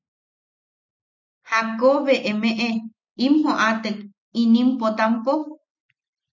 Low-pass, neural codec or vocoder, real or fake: 7.2 kHz; none; real